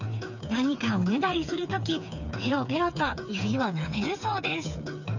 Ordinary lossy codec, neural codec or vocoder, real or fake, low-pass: none; codec, 16 kHz, 4 kbps, FreqCodec, smaller model; fake; 7.2 kHz